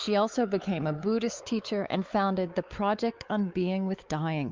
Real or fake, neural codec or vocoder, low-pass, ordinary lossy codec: fake; codec, 16 kHz, 4 kbps, X-Codec, WavLM features, trained on Multilingual LibriSpeech; 7.2 kHz; Opus, 24 kbps